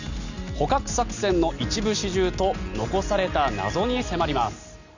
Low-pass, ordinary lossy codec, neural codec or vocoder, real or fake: 7.2 kHz; none; none; real